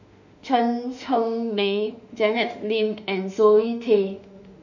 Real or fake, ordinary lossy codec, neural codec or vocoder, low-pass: fake; none; autoencoder, 48 kHz, 32 numbers a frame, DAC-VAE, trained on Japanese speech; 7.2 kHz